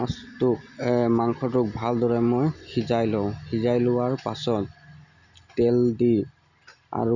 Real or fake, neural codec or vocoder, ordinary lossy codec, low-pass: real; none; none; 7.2 kHz